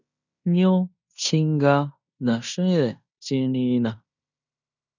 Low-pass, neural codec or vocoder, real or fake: 7.2 kHz; codec, 16 kHz in and 24 kHz out, 0.9 kbps, LongCat-Audio-Codec, fine tuned four codebook decoder; fake